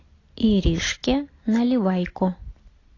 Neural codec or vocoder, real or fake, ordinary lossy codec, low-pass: none; real; AAC, 32 kbps; 7.2 kHz